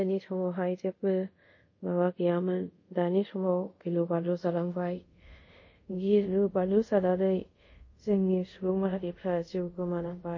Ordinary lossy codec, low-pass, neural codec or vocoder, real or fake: MP3, 32 kbps; 7.2 kHz; codec, 24 kHz, 0.5 kbps, DualCodec; fake